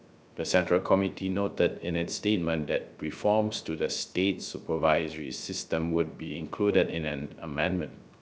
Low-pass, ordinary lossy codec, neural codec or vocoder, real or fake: none; none; codec, 16 kHz, 0.3 kbps, FocalCodec; fake